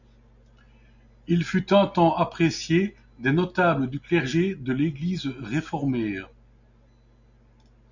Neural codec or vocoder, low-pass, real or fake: none; 7.2 kHz; real